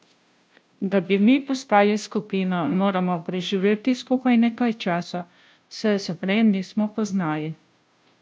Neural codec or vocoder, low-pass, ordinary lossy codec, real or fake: codec, 16 kHz, 0.5 kbps, FunCodec, trained on Chinese and English, 25 frames a second; none; none; fake